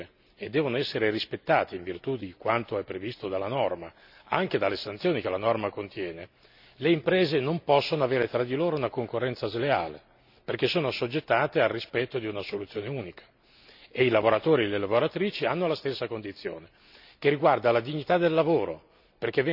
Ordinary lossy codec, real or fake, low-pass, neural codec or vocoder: none; real; 5.4 kHz; none